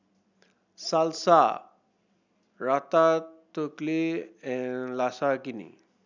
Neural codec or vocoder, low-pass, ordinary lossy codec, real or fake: none; 7.2 kHz; none; real